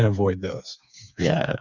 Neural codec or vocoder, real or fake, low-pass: codec, 16 kHz, 4 kbps, FreqCodec, smaller model; fake; 7.2 kHz